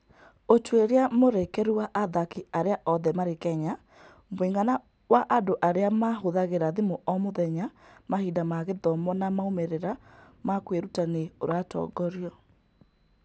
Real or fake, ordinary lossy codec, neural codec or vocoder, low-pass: real; none; none; none